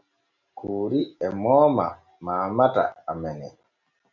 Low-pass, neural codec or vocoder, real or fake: 7.2 kHz; none; real